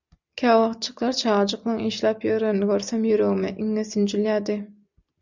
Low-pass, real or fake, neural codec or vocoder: 7.2 kHz; real; none